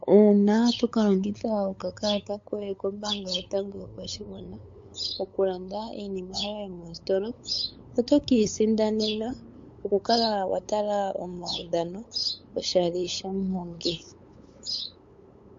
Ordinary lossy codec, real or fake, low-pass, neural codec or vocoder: MP3, 48 kbps; fake; 7.2 kHz; codec, 16 kHz, 8 kbps, FunCodec, trained on LibriTTS, 25 frames a second